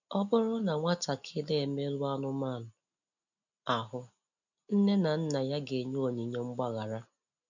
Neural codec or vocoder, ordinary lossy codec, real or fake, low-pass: none; none; real; 7.2 kHz